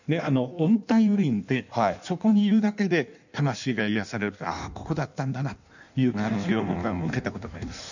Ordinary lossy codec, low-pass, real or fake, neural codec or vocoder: none; 7.2 kHz; fake; codec, 16 kHz in and 24 kHz out, 1.1 kbps, FireRedTTS-2 codec